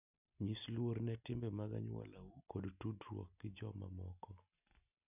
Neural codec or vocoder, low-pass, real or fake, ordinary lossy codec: none; 3.6 kHz; real; none